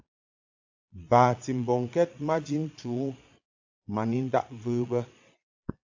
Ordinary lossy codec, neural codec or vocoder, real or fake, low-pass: AAC, 48 kbps; vocoder, 22.05 kHz, 80 mel bands, Vocos; fake; 7.2 kHz